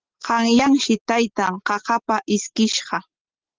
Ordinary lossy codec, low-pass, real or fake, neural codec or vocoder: Opus, 16 kbps; 7.2 kHz; real; none